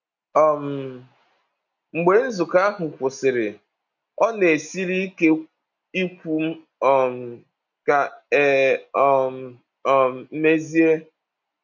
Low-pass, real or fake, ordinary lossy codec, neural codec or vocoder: 7.2 kHz; real; none; none